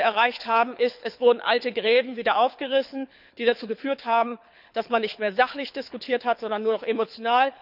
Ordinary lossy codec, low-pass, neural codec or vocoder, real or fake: none; 5.4 kHz; codec, 24 kHz, 6 kbps, HILCodec; fake